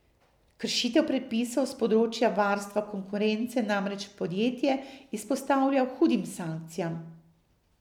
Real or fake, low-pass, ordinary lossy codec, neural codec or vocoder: real; 19.8 kHz; none; none